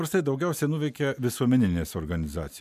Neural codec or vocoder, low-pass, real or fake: none; 14.4 kHz; real